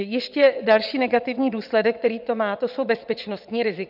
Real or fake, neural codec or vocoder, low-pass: fake; vocoder, 22.05 kHz, 80 mel bands, WaveNeXt; 5.4 kHz